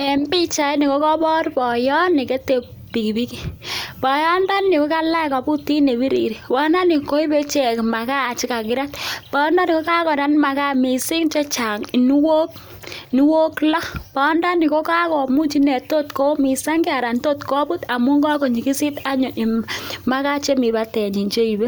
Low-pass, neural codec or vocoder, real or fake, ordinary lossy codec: none; none; real; none